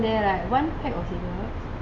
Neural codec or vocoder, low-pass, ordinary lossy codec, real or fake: none; 9.9 kHz; AAC, 64 kbps; real